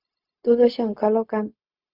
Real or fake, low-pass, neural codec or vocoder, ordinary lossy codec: fake; 5.4 kHz; codec, 16 kHz, 0.4 kbps, LongCat-Audio-Codec; Opus, 64 kbps